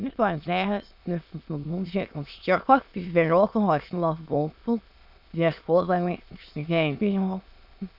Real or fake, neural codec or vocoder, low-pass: fake; autoencoder, 22.05 kHz, a latent of 192 numbers a frame, VITS, trained on many speakers; 5.4 kHz